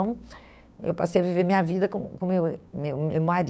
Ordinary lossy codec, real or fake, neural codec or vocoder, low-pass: none; fake; codec, 16 kHz, 6 kbps, DAC; none